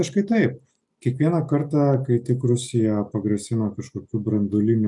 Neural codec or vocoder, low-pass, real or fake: none; 10.8 kHz; real